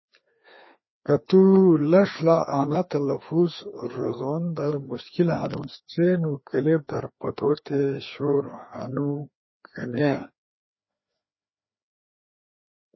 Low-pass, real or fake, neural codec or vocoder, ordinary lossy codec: 7.2 kHz; fake; codec, 16 kHz, 2 kbps, FreqCodec, larger model; MP3, 24 kbps